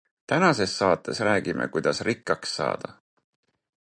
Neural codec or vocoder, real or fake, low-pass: none; real; 9.9 kHz